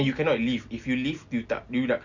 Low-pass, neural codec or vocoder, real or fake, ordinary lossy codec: 7.2 kHz; none; real; MP3, 64 kbps